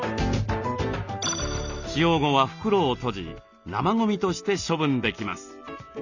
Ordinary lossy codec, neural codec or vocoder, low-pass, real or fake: Opus, 64 kbps; none; 7.2 kHz; real